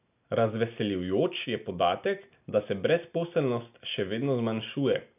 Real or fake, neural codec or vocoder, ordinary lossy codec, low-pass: real; none; none; 3.6 kHz